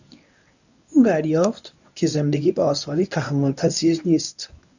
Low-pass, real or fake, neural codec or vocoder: 7.2 kHz; fake; codec, 24 kHz, 0.9 kbps, WavTokenizer, medium speech release version 1